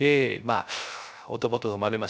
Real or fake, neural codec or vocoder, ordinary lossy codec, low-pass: fake; codec, 16 kHz, 0.3 kbps, FocalCodec; none; none